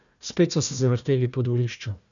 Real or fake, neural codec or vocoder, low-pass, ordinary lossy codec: fake; codec, 16 kHz, 1 kbps, FunCodec, trained on Chinese and English, 50 frames a second; 7.2 kHz; none